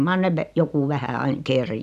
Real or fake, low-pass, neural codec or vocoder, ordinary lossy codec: real; 14.4 kHz; none; none